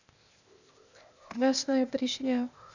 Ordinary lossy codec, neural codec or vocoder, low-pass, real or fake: none; codec, 16 kHz, 0.8 kbps, ZipCodec; 7.2 kHz; fake